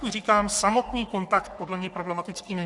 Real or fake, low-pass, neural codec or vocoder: fake; 10.8 kHz; codec, 44.1 kHz, 3.4 kbps, Pupu-Codec